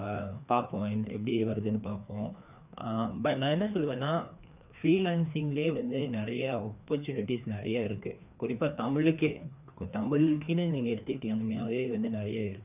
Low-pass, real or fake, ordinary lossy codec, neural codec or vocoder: 3.6 kHz; fake; none; codec, 16 kHz, 2 kbps, FreqCodec, larger model